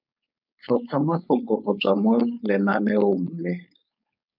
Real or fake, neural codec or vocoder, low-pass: fake; codec, 16 kHz, 4.8 kbps, FACodec; 5.4 kHz